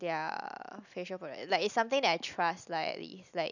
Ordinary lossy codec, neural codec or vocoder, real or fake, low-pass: none; none; real; 7.2 kHz